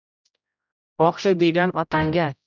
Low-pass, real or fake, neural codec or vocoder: 7.2 kHz; fake; codec, 16 kHz, 0.5 kbps, X-Codec, HuBERT features, trained on general audio